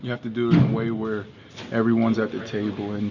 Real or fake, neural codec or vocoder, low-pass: real; none; 7.2 kHz